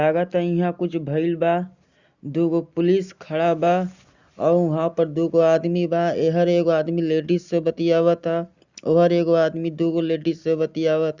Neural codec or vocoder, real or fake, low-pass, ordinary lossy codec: none; real; 7.2 kHz; Opus, 64 kbps